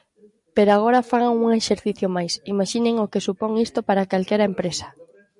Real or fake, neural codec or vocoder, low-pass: real; none; 10.8 kHz